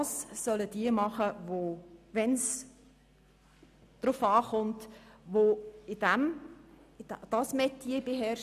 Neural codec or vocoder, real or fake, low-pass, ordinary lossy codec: none; real; 14.4 kHz; none